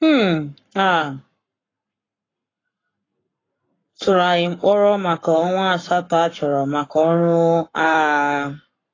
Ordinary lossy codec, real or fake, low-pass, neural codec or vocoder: AAC, 32 kbps; fake; 7.2 kHz; codec, 44.1 kHz, 7.8 kbps, Pupu-Codec